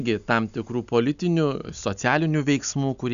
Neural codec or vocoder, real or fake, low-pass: none; real; 7.2 kHz